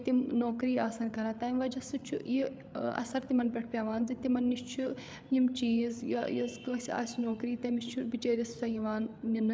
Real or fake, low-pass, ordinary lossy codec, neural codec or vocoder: fake; none; none; codec, 16 kHz, 8 kbps, FreqCodec, larger model